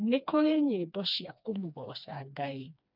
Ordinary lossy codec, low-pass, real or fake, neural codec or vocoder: none; 5.4 kHz; fake; codec, 16 kHz, 2 kbps, FreqCodec, smaller model